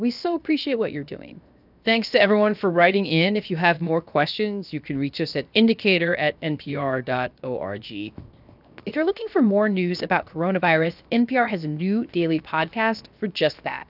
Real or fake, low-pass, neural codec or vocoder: fake; 5.4 kHz; codec, 16 kHz, 0.7 kbps, FocalCodec